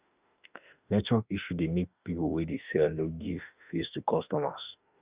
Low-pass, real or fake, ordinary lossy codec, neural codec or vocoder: 3.6 kHz; fake; Opus, 64 kbps; autoencoder, 48 kHz, 32 numbers a frame, DAC-VAE, trained on Japanese speech